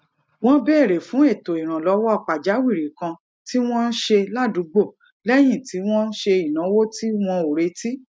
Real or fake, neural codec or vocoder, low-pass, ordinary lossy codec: real; none; none; none